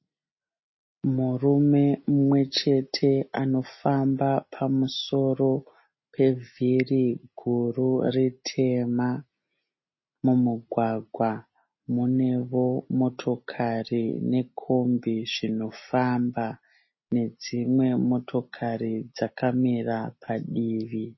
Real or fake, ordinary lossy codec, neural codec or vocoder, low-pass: real; MP3, 24 kbps; none; 7.2 kHz